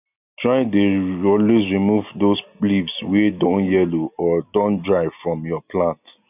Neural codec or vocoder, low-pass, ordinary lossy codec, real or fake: none; 3.6 kHz; none; real